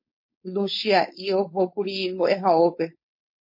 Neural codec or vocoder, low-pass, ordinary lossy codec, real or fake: codec, 16 kHz, 4.8 kbps, FACodec; 5.4 kHz; MP3, 32 kbps; fake